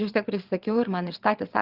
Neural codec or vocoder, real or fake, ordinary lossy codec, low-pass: vocoder, 22.05 kHz, 80 mel bands, WaveNeXt; fake; Opus, 32 kbps; 5.4 kHz